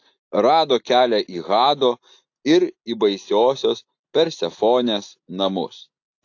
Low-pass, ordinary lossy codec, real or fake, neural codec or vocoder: 7.2 kHz; AAC, 48 kbps; real; none